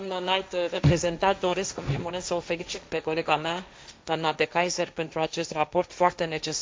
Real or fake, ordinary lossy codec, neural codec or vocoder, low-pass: fake; none; codec, 16 kHz, 1.1 kbps, Voila-Tokenizer; none